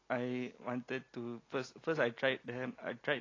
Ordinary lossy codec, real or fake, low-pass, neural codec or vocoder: AAC, 32 kbps; real; 7.2 kHz; none